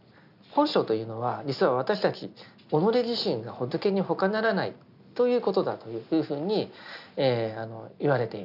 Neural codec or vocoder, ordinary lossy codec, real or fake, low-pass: none; none; real; 5.4 kHz